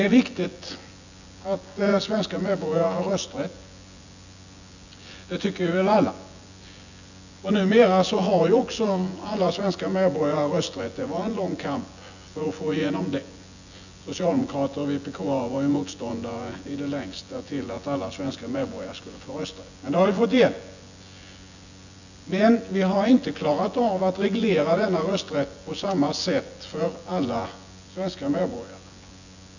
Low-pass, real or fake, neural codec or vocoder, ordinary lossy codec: 7.2 kHz; fake; vocoder, 24 kHz, 100 mel bands, Vocos; none